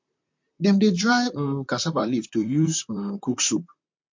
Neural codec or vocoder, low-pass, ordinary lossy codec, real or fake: vocoder, 44.1 kHz, 128 mel bands, Pupu-Vocoder; 7.2 kHz; MP3, 48 kbps; fake